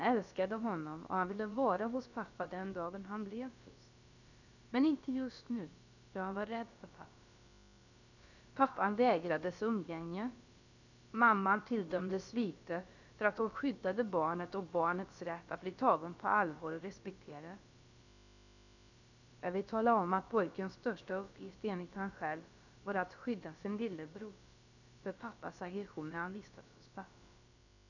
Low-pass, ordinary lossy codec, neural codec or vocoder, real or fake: 7.2 kHz; none; codec, 16 kHz, about 1 kbps, DyCAST, with the encoder's durations; fake